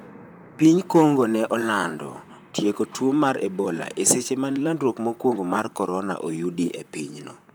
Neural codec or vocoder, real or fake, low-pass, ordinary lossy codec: vocoder, 44.1 kHz, 128 mel bands, Pupu-Vocoder; fake; none; none